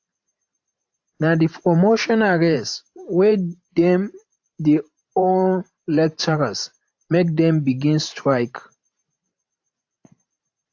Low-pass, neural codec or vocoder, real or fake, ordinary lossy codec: 7.2 kHz; vocoder, 44.1 kHz, 128 mel bands every 512 samples, BigVGAN v2; fake; AAC, 48 kbps